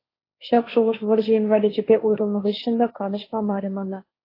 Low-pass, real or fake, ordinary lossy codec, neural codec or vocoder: 5.4 kHz; fake; AAC, 24 kbps; codec, 16 kHz, 1.1 kbps, Voila-Tokenizer